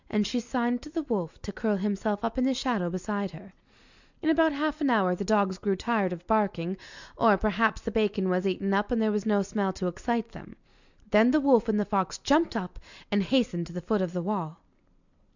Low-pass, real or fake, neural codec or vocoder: 7.2 kHz; real; none